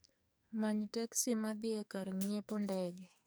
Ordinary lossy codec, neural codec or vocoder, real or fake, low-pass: none; codec, 44.1 kHz, 2.6 kbps, SNAC; fake; none